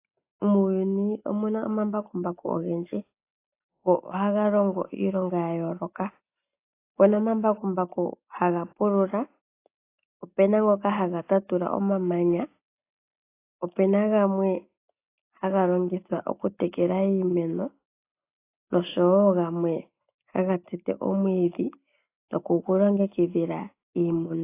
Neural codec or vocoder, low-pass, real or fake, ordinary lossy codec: none; 3.6 kHz; real; AAC, 24 kbps